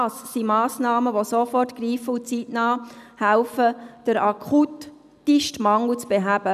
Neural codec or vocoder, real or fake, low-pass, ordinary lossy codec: none; real; 14.4 kHz; none